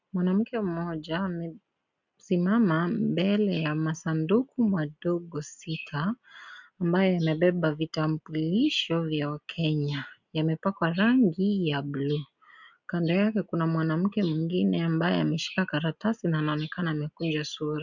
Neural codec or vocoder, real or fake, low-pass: none; real; 7.2 kHz